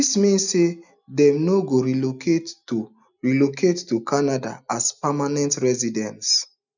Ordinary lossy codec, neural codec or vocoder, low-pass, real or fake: none; none; 7.2 kHz; real